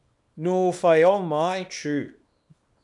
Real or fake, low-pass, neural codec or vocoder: fake; 10.8 kHz; codec, 24 kHz, 0.9 kbps, WavTokenizer, small release